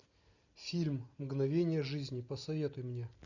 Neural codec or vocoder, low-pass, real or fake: none; 7.2 kHz; real